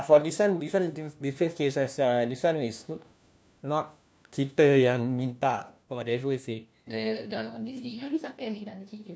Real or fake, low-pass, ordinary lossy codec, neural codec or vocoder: fake; none; none; codec, 16 kHz, 1 kbps, FunCodec, trained on LibriTTS, 50 frames a second